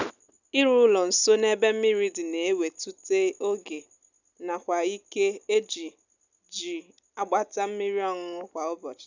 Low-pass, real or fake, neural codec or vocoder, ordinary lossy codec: 7.2 kHz; real; none; none